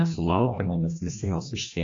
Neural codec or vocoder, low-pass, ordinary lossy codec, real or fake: codec, 16 kHz, 1 kbps, FreqCodec, larger model; 7.2 kHz; AAC, 48 kbps; fake